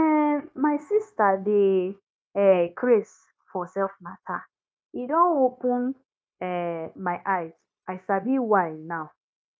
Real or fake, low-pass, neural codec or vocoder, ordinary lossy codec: fake; none; codec, 16 kHz, 0.9 kbps, LongCat-Audio-Codec; none